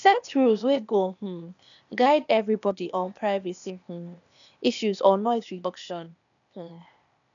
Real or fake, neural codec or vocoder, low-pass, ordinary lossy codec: fake; codec, 16 kHz, 0.8 kbps, ZipCodec; 7.2 kHz; MP3, 96 kbps